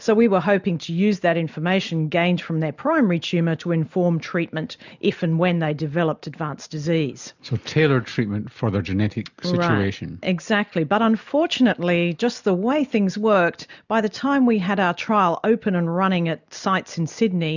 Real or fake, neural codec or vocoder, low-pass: real; none; 7.2 kHz